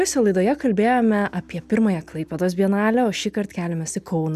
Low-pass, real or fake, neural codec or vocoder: 14.4 kHz; real; none